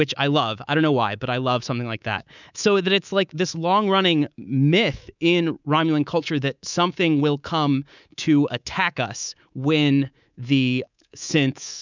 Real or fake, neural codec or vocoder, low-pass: fake; codec, 24 kHz, 3.1 kbps, DualCodec; 7.2 kHz